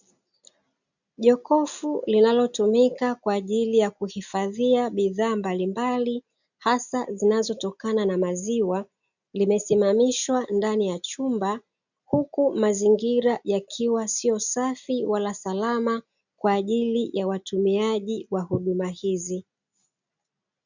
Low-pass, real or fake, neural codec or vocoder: 7.2 kHz; real; none